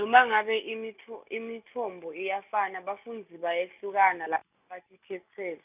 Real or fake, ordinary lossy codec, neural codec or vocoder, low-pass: real; none; none; 3.6 kHz